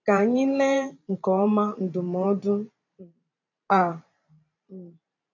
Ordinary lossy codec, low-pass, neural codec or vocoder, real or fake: none; 7.2 kHz; vocoder, 44.1 kHz, 128 mel bands every 512 samples, BigVGAN v2; fake